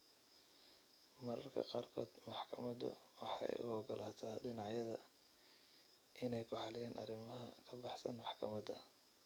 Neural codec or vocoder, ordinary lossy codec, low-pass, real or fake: codec, 44.1 kHz, 7.8 kbps, DAC; none; none; fake